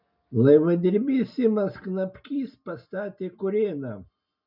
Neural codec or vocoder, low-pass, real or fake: none; 5.4 kHz; real